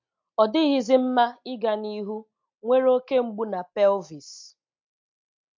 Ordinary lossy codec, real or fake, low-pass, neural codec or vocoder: MP3, 48 kbps; real; 7.2 kHz; none